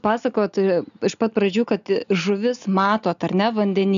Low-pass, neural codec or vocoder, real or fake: 7.2 kHz; none; real